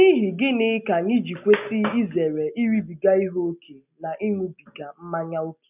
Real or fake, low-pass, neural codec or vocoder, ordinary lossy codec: real; 3.6 kHz; none; none